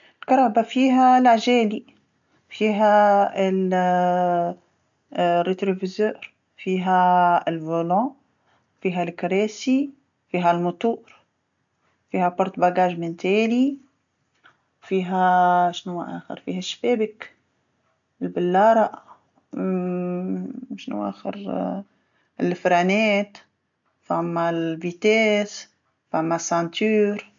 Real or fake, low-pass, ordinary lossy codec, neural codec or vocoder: real; 7.2 kHz; MP3, 64 kbps; none